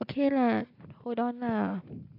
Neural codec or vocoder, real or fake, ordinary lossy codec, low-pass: none; real; AAC, 32 kbps; 5.4 kHz